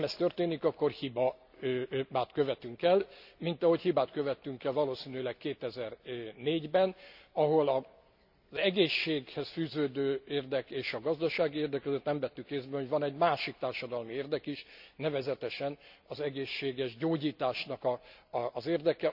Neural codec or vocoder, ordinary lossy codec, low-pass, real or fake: none; none; 5.4 kHz; real